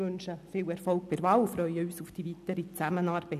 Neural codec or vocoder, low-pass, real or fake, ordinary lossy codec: none; 14.4 kHz; real; none